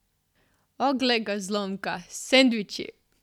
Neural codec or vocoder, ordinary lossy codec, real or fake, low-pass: none; none; real; 19.8 kHz